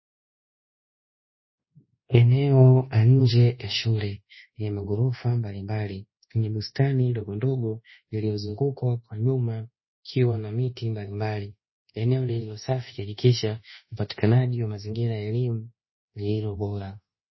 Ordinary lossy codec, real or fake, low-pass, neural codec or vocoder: MP3, 24 kbps; fake; 7.2 kHz; codec, 24 kHz, 1.2 kbps, DualCodec